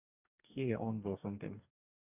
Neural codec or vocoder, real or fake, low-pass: codec, 44.1 kHz, 2.6 kbps, DAC; fake; 3.6 kHz